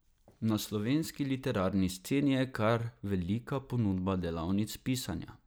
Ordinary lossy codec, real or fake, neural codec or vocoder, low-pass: none; real; none; none